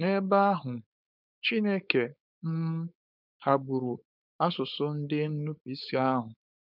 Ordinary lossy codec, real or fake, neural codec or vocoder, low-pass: none; fake; codec, 16 kHz, 4.8 kbps, FACodec; 5.4 kHz